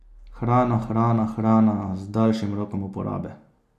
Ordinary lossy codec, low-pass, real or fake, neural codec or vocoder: AAC, 96 kbps; 14.4 kHz; real; none